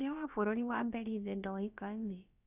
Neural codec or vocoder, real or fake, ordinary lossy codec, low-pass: codec, 16 kHz, about 1 kbps, DyCAST, with the encoder's durations; fake; none; 3.6 kHz